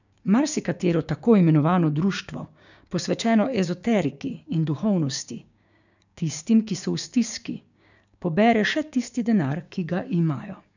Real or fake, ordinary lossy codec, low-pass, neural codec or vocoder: fake; none; 7.2 kHz; codec, 16 kHz, 6 kbps, DAC